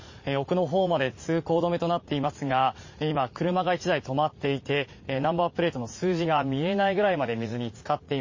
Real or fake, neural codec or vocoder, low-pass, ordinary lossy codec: fake; vocoder, 44.1 kHz, 80 mel bands, Vocos; 7.2 kHz; MP3, 32 kbps